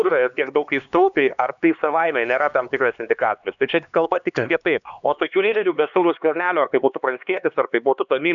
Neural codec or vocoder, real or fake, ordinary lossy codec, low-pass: codec, 16 kHz, 2 kbps, X-Codec, HuBERT features, trained on LibriSpeech; fake; MP3, 96 kbps; 7.2 kHz